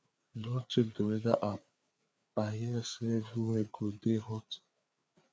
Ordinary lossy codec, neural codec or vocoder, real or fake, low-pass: none; codec, 16 kHz, 4 kbps, FreqCodec, larger model; fake; none